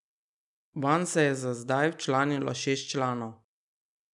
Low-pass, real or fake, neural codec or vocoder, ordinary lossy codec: 10.8 kHz; real; none; none